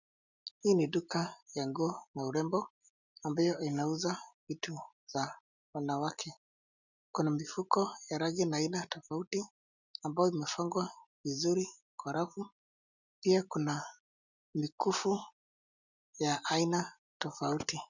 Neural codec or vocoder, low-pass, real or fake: none; 7.2 kHz; real